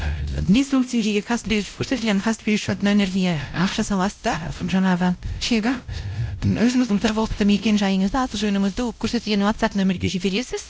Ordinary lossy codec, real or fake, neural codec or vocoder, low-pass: none; fake; codec, 16 kHz, 0.5 kbps, X-Codec, WavLM features, trained on Multilingual LibriSpeech; none